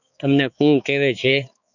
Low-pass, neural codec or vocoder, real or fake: 7.2 kHz; codec, 16 kHz, 4 kbps, X-Codec, HuBERT features, trained on balanced general audio; fake